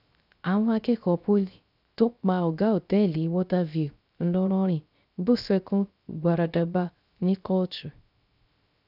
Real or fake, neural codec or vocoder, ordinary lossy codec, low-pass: fake; codec, 16 kHz, 0.3 kbps, FocalCodec; none; 5.4 kHz